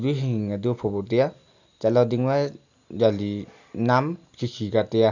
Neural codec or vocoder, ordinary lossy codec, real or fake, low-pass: none; none; real; 7.2 kHz